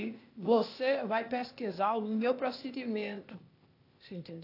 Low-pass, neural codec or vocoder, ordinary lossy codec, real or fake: 5.4 kHz; codec, 16 kHz, 0.8 kbps, ZipCodec; AAC, 32 kbps; fake